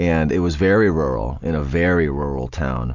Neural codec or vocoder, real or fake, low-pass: none; real; 7.2 kHz